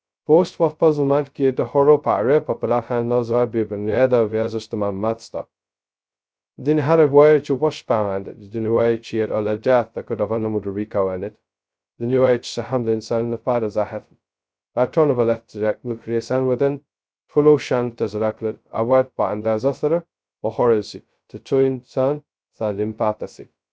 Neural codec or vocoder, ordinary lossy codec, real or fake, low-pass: codec, 16 kHz, 0.2 kbps, FocalCodec; none; fake; none